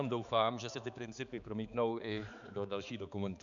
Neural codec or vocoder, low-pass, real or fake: codec, 16 kHz, 4 kbps, X-Codec, HuBERT features, trained on balanced general audio; 7.2 kHz; fake